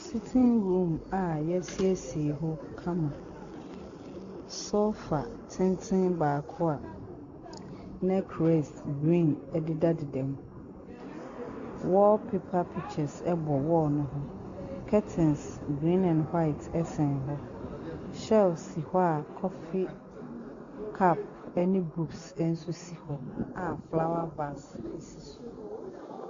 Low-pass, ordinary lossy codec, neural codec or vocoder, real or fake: 7.2 kHz; Opus, 64 kbps; none; real